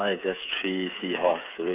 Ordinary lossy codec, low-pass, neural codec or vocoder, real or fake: none; 3.6 kHz; codec, 16 kHz, 8 kbps, FreqCodec, smaller model; fake